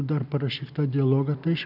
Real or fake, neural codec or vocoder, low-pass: real; none; 5.4 kHz